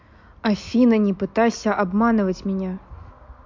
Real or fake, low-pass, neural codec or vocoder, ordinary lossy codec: real; 7.2 kHz; none; MP3, 48 kbps